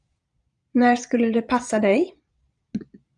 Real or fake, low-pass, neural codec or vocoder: fake; 9.9 kHz; vocoder, 22.05 kHz, 80 mel bands, Vocos